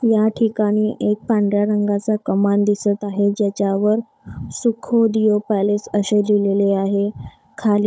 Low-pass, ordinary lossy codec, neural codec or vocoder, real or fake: none; none; codec, 16 kHz, 16 kbps, FunCodec, trained on Chinese and English, 50 frames a second; fake